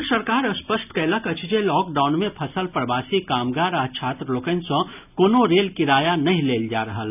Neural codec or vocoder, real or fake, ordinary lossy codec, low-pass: none; real; none; 3.6 kHz